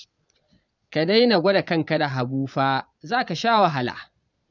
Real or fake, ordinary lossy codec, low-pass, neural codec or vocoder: fake; none; 7.2 kHz; vocoder, 22.05 kHz, 80 mel bands, Vocos